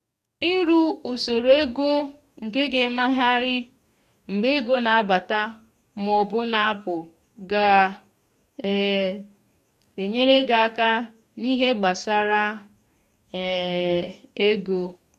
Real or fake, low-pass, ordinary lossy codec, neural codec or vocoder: fake; 14.4 kHz; none; codec, 44.1 kHz, 2.6 kbps, DAC